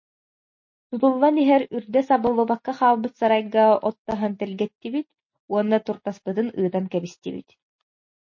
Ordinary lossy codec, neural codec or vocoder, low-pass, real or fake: MP3, 32 kbps; none; 7.2 kHz; real